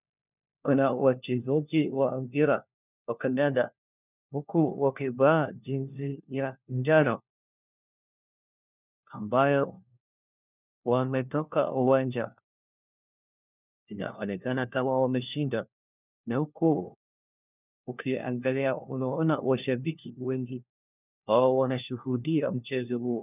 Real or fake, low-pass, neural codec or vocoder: fake; 3.6 kHz; codec, 16 kHz, 1 kbps, FunCodec, trained on LibriTTS, 50 frames a second